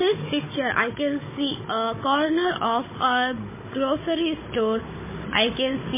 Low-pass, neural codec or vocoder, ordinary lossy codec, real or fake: 3.6 kHz; codec, 16 kHz, 16 kbps, FunCodec, trained on Chinese and English, 50 frames a second; MP3, 16 kbps; fake